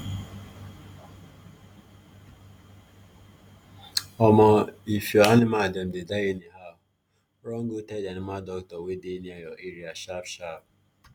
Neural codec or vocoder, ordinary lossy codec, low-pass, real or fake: none; none; none; real